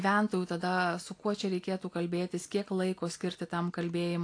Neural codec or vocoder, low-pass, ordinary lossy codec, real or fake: none; 9.9 kHz; AAC, 48 kbps; real